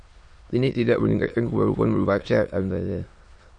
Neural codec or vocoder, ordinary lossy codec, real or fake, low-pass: autoencoder, 22.05 kHz, a latent of 192 numbers a frame, VITS, trained on many speakers; MP3, 48 kbps; fake; 9.9 kHz